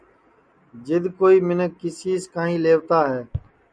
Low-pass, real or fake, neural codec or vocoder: 9.9 kHz; real; none